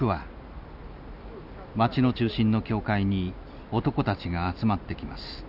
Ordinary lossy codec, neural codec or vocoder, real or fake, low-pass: none; none; real; 5.4 kHz